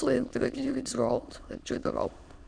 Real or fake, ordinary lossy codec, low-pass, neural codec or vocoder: fake; none; 9.9 kHz; autoencoder, 22.05 kHz, a latent of 192 numbers a frame, VITS, trained on many speakers